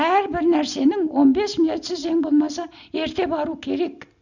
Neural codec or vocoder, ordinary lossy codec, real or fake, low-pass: none; none; real; 7.2 kHz